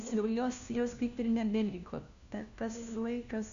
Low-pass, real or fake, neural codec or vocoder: 7.2 kHz; fake; codec, 16 kHz, 1 kbps, FunCodec, trained on LibriTTS, 50 frames a second